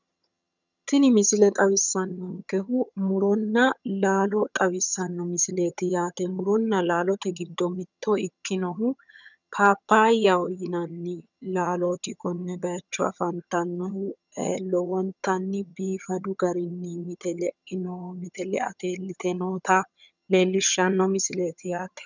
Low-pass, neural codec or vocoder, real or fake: 7.2 kHz; vocoder, 22.05 kHz, 80 mel bands, HiFi-GAN; fake